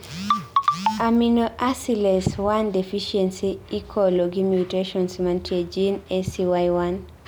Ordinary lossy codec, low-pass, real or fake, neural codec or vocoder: none; none; real; none